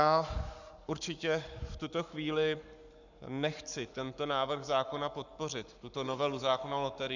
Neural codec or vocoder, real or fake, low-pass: codec, 44.1 kHz, 7.8 kbps, Pupu-Codec; fake; 7.2 kHz